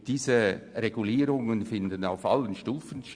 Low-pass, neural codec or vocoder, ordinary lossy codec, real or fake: 9.9 kHz; none; Opus, 64 kbps; real